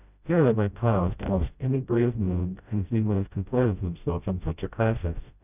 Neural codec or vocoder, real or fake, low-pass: codec, 16 kHz, 0.5 kbps, FreqCodec, smaller model; fake; 3.6 kHz